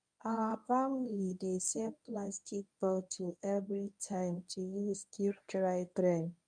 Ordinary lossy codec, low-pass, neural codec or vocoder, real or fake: none; 9.9 kHz; codec, 24 kHz, 0.9 kbps, WavTokenizer, medium speech release version 1; fake